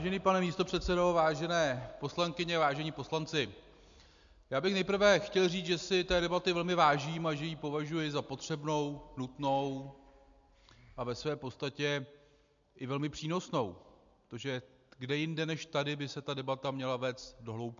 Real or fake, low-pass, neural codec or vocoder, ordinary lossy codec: real; 7.2 kHz; none; MP3, 64 kbps